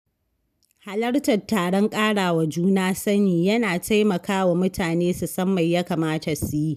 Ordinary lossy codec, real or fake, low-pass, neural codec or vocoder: none; real; 14.4 kHz; none